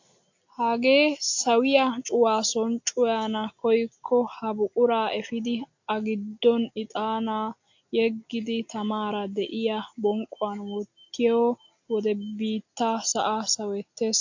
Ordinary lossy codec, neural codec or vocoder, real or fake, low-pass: AAC, 48 kbps; none; real; 7.2 kHz